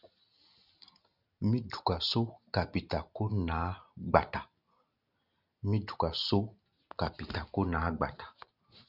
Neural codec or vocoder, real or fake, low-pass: none; real; 5.4 kHz